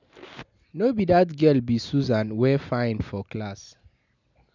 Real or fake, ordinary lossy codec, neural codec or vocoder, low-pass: real; none; none; 7.2 kHz